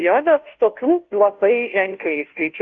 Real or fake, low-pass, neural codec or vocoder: fake; 7.2 kHz; codec, 16 kHz, 0.5 kbps, FunCodec, trained on Chinese and English, 25 frames a second